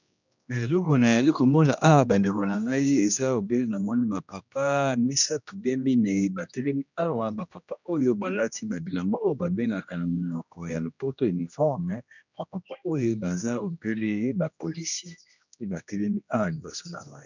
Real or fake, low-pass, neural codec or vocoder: fake; 7.2 kHz; codec, 16 kHz, 1 kbps, X-Codec, HuBERT features, trained on general audio